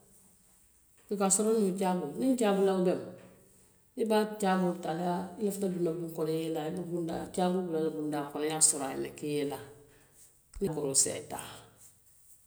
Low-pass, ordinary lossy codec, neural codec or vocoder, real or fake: none; none; none; real